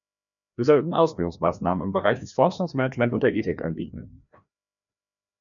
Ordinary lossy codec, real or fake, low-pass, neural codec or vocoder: MP3, 96 kbps; fake; 7.2 kHz; codec, 16 kHz, 1 kbps, FreqCodec, larger model